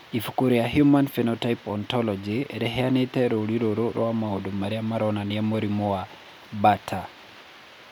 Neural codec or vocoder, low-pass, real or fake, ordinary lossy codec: none; none; real; none